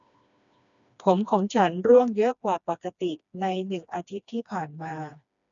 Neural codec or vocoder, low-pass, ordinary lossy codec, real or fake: codec, 16 kHz, 2 kbps, FreqCodec, smaller model; 7.2 kHz; none; fake